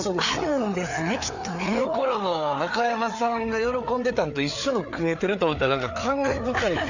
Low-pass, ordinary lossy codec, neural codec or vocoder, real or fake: 7.2 kHz; none; codec, 16 kHz, 4 kbps, FreqCodec, larger model; fake